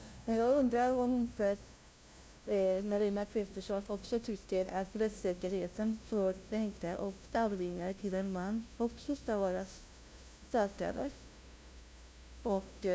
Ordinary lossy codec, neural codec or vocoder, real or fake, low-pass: none; codec, 16 kHz, 0.5 kbps, FunCodec, trained on LibriTTS, 25 frames a second; fake; none